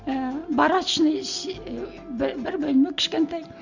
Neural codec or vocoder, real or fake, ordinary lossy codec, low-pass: none; real; Opus, 64 kbps; 7.2 kHz